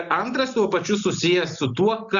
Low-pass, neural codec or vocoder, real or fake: 7.2 kHz; none; real